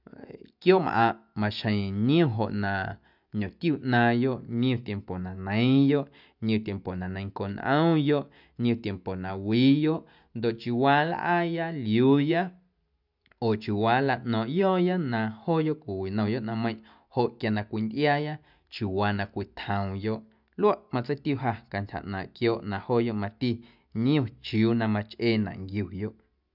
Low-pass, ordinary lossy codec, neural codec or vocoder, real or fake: 5.4 kHz; none; none; real